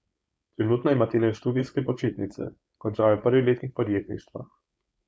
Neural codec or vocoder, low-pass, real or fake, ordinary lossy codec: codec, 16 kHz, 4.8 kbps, FACodec; none; fake; none